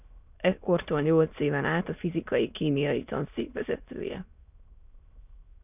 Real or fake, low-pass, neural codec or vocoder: fake; 3.6 kHz; autoencoder, 22.05 kHz, a latent of 192 numbers a frame, VITS, trained on many speakers